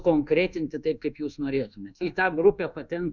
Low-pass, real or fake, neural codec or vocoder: 7.2 kHz; fake; codec, 24 kHz, 1.2 kbps, DualCodec